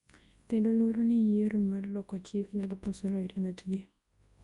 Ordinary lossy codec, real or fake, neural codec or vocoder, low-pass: Opus, 64 kbps; fake; codec, 24 kHz, 0.9 kbps, WavTokenizer, large speech release; 10.8 kHz